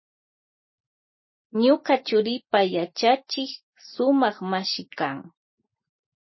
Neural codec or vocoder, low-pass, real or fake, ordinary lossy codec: none; 7.2 kHz; real; MP3, 24 kbps